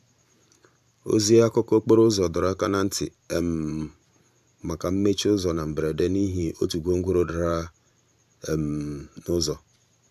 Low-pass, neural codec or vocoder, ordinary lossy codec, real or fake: 14.4 kHz; vocoder, 44.1 kHz, 128 mel bands every 512 samples, BigVGAN v2; AAC, 96 kbps; fake